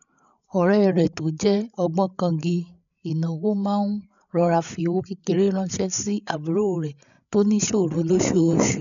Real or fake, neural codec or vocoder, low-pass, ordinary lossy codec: fake; codec, 16 kHz, 8 kbps, FreqCodec, larger model; 7.2 kHz; none